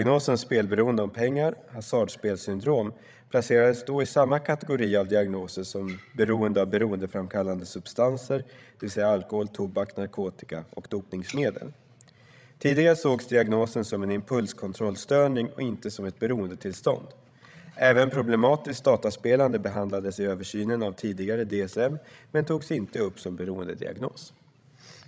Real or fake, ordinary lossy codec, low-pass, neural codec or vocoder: fake; none; none; codec, 16 kHz, 16 kbps, FreqCodec, larger model